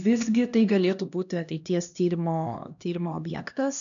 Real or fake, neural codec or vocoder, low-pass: fake; codec, 16 kHz, 1 kbps, X-Codec, HuBERT features, trained on LibriSpeech; 7.2 kHz